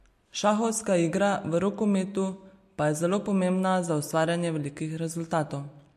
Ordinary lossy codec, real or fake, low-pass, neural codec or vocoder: MP3, 64 kbps; real; 14.4 kHz; none